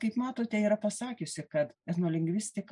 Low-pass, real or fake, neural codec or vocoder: 10.8 kHz; real; none